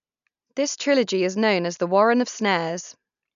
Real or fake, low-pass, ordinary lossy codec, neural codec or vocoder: real; 7.2 kHz; none; none